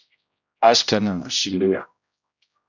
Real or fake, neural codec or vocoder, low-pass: fake; codec, 16 kHz, 0.5 kbps, X-Codec, HuBERT features, trained on general audio; 7.2 kHz